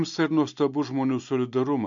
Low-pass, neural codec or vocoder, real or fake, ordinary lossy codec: 7.2 kHz; none; real; MP3, 64 kbps